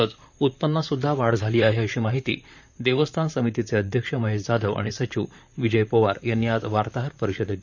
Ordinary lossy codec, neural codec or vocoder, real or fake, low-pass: none; vocoder, 44.1 kHz, 128 mel bands, Pupu-Vocoder; fake; 7.2 kHz